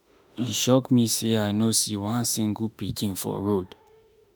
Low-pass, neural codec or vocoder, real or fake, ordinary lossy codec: none; autoencoder, 48 kHz, 32 numbers a frame, DAC-VAE, trained on Japanese speech; fake; none